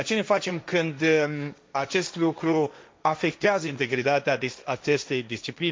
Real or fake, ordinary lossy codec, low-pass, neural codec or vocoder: fake; none; none; codec, 16 kHz, 1.1 kbps, Voila-Tokenizer